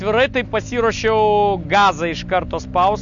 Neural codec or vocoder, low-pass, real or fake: none; 7.2 kHz; real